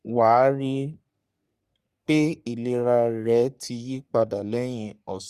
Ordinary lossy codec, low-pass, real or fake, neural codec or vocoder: Opus, 64 kbps; 14.4 kHz; fake; codec, 44.1 kHz, 3.4 kbps, Pupu-Codec